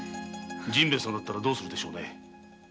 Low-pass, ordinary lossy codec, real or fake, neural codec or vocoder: none; none; real; none